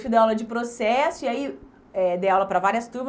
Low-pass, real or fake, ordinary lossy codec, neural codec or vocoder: none; real; none; none